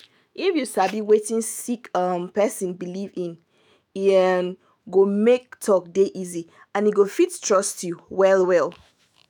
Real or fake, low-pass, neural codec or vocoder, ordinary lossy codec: fake; none; autoencoder, 48 kHz, 128 numbers a frame, DAC-VAE, trained on Japanese speech; none